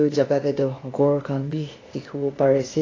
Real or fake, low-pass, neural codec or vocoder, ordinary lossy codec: fake; 7.2 kHz; codec, 16 kHz, 0.8 kbps, ZipCodec; AAC, 32 kbps